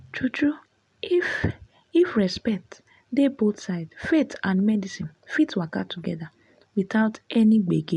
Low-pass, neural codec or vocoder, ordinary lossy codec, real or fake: 10.8 kHz; none; none; real